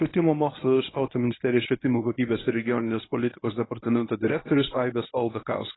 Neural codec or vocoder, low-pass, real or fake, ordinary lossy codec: codec, 24 kHz, 0.9 kbps, WavTokenizer, medium speech release version 1; 7.2 kHz; fake; AAC, 16 kbps